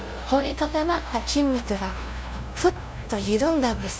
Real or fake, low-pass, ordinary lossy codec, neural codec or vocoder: fake; none; none; codec, 16 kHz, 0.5 kbps, FunCodec, trained on LibriTTS, 25 frames a second